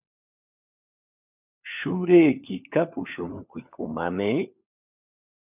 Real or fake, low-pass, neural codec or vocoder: fake; 3.6 kHz; codec, 16 kHz, 4 kbps, FunCodec, trained on LibriTTS, 50 frames a second